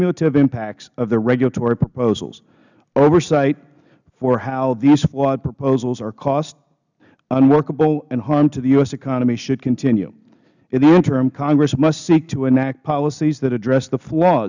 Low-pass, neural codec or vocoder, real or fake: 7.2 kHz; none; real